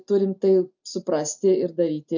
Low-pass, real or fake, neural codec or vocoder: 7.2 kHz; real; none